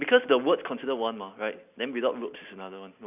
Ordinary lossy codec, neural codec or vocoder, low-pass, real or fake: none; none; 3.6 kHz; real